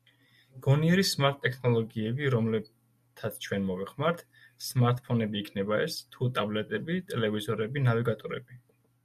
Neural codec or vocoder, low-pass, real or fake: none; 14.4 kHz; real